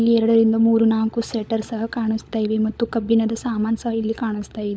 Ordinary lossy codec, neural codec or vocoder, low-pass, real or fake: none; codec, 16 kHz, 16 kbps, FunCodec, trained on LibriTTS, 50 frames a second; none; fake